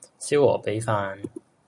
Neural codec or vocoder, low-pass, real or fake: none; 10.8 kHz; real